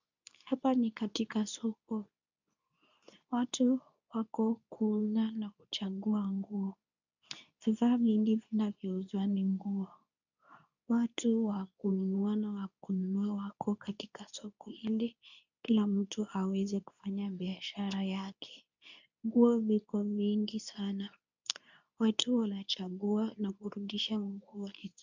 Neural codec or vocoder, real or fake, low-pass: codec, 24 kHz, 0.9 kbps, WavTokenizer, medium speech release version 2; fake; 7.2 kHz